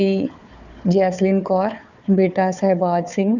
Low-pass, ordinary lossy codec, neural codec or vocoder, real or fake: 7.2 kHz; none; codec, 16 kHz, 4 kbps, FunCodec, trained on Chinese and English, 50 frames a second; fake